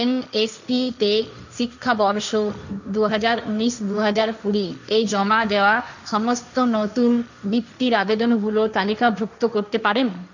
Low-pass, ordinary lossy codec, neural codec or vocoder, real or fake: 7.2 kHz; none; codec, 16 kHz, 1.1 kbps, Voila-Tokenizer; fake